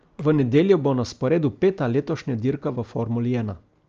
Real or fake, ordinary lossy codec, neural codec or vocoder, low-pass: real; Opus, 24 kbps; none; 7.2 kHz